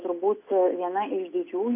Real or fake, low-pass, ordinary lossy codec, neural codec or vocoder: real; 3.6 kHz; MP3, 24 kbps; none